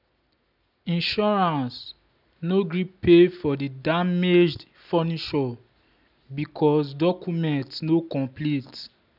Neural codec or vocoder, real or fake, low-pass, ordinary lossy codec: none; real; 5.4 kHz; none